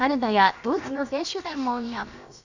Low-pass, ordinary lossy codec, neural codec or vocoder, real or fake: 7.2 kHz; none; codec, 16 kHz, about 1 kbps, DyCAST, with the encoder's durations; fake